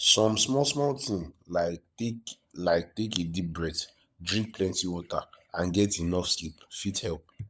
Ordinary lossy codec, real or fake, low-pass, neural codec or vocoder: none; fake; none; codec, 16 kHz, 16 kbps, FunCodec, trained on LibriTTS, 50 frames a second